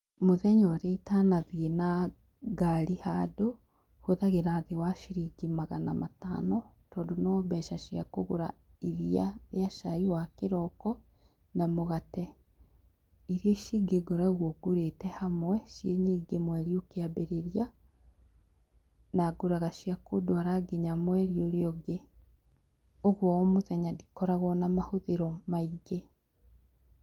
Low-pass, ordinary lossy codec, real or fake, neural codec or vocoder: 19.8 kHz; Opus, 32 kbps; real; none